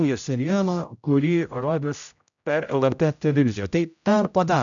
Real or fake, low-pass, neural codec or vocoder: fake; 7.2 kHz; codec, 16 kHz, 0.5 kbps, X-Codec, HuBERT features, trained on general audio